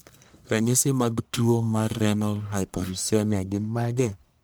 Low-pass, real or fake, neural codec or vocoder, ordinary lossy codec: none; fake; codec, 44.1 kHz, 1.7 kbps, Pupu-Codec; none